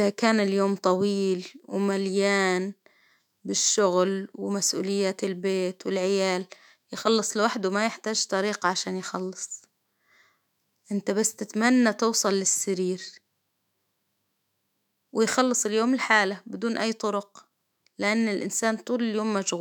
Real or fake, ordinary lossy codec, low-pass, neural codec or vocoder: fake; none; 19.8 kHz; autoencoder, 48 kHz, 128 numbers a frame, DAC-VAE, trained on Japanese speech